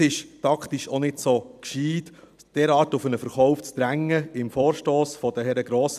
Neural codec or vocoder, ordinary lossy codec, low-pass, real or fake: vocoder, 44.1 kHz, 128 mel bands every 256 samples, BigVGAN v2; none; 14.4 kHz; fake